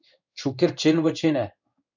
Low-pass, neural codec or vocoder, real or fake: 7.2 kHz; codec, 16 kHz in and 24 kHz out, 1 kbps, XY-Tokenizer; fake